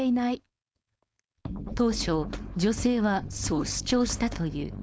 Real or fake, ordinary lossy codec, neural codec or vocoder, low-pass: fake; none; codec, 16 kHz, 4.8 kbps, FACodec; none